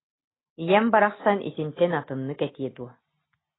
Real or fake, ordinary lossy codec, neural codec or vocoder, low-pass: real; AAC, 16 kbps; none; 7.2 kHz